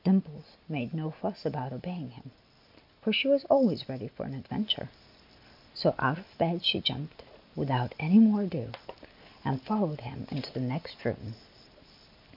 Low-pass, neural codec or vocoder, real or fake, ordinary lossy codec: 5.4 kHz; none; real; MP3, 48 kbps